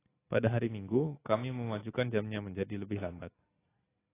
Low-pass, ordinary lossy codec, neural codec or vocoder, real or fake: 3.6 kHz; AAC, 16 kbps; none; real